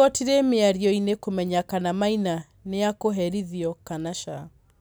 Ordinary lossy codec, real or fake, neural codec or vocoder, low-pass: none; real; none; none